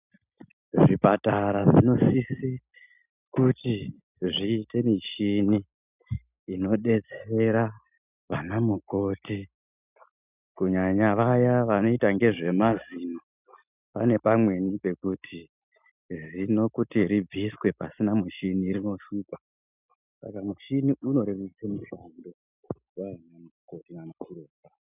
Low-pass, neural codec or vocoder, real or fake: 3.6 kHz; none; real